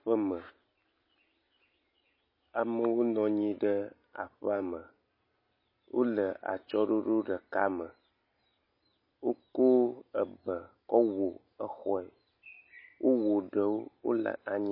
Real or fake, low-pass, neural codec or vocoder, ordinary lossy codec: real; 5.4 kHz; none; MP3, 24 kbps